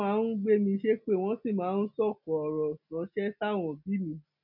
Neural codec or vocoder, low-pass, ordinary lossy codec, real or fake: none; 5.4 kHz; none; real